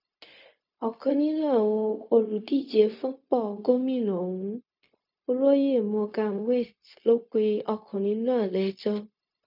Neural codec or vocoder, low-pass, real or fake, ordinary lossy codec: codec, 16 kHz, 0.4 kbps, LongCat-Audio-Codec; 5.4 kHz; fake; none